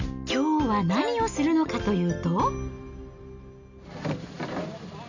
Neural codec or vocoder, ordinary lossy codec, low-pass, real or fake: none; none; 7.2 kHz; real